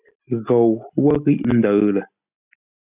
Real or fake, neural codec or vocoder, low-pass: real; none; 3.6 kHz